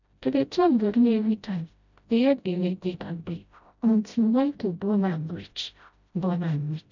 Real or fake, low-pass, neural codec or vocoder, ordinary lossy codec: fake; 7.2 kHz; codec, 16 kHz, 0.5 kbps, FreqCodec, smaller model; none